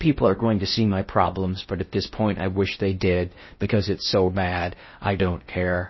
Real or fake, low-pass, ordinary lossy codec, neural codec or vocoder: fake; 7.2 kHz; MP3, 24 kbps; codec, 16 kHz in and 24 kHz out, 0.6 kbps, FocalCodec, streaming, 4096 codes